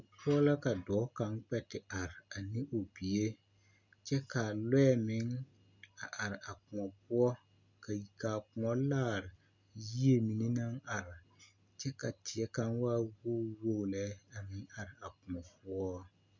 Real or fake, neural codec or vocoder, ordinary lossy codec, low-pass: real; none; MP3, 64 kbps; 7.2 kHz